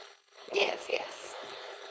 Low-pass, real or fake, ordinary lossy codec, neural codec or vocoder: none; fake; none; codec, 16 kHz, 4.8 kbps, FACodec